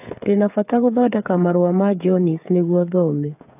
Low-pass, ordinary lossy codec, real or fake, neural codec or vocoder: 3.6 kHz; AAC, 32 kbps; fake; codec, 16 kHz, 8 kbps, FreqCodec, smaller model